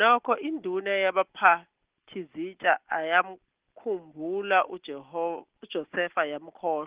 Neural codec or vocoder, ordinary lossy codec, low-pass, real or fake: none; Opus, 16 kbps; 3.6 kHz; real